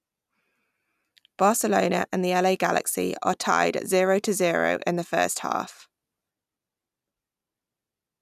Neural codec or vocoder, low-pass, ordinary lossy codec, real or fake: none; 14.4 kHz; none; real